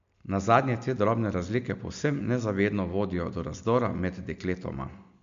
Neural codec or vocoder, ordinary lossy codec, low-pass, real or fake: none; MP3, 64 kbps; 7.2 kHz; real